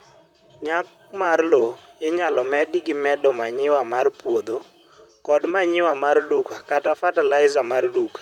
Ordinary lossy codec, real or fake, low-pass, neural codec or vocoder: none; fake; 19.8 kHz; vocoder, 44.1 kHz, 128 mel bands, Pupu-Vocoder